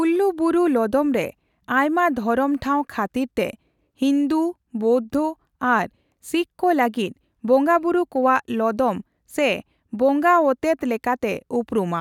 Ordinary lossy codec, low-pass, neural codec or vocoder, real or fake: none; 19.8 kHz; none; real